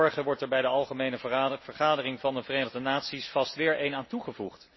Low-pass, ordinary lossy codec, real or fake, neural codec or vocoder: 7.2 kHz; MP3, 24 kbps; real; none